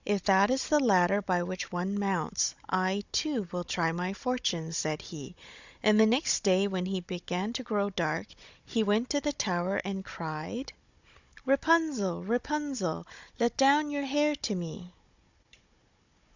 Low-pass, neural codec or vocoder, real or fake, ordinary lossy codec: 7.2 kHz; codec, 16 kHz, 16 kbps, FunCodec, trained on Chinese and English, 50 frames a second; fake; Opus, 64 kbps